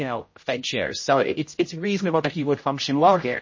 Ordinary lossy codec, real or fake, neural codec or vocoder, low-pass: MP3, 32 kbps; fake; codec, 16 kHz, 0.5 kbps, X-Codec, HuBERT features, trained on general audio; 7.2 kHz